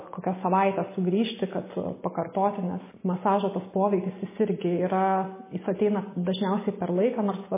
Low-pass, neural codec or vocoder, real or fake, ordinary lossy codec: 3.6 kHz; none; real; MP3, 16 kbps